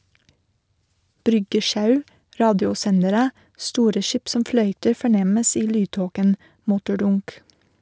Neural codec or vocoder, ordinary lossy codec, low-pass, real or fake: none; none; none; real